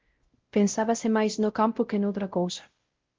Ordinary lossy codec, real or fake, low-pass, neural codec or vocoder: Opus, 16 kbps; fake; 7.2 kHz; codec, 16 kHz, 0.5 kbps, X-Codec, WavLM features, trained on Multilingual LibriSpeech